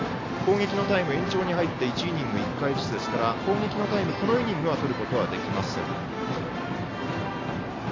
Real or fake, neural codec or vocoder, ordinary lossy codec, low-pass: real; none; AAC, 32 kbps; 7.2 kHz